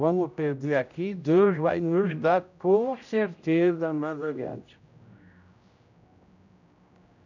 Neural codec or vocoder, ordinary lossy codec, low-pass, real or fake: codec, 16 kHz, 0.5 kbps, X-Codec, HuBERT features, trained on general audio; none; 7.2 kHz; fake